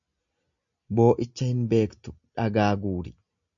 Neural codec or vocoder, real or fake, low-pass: none; real; 7.2 kHz